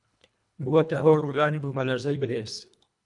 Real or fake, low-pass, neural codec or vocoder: fake; 10.8 kHz; codec, 24 kHz, 1.5 kbps, HILCodec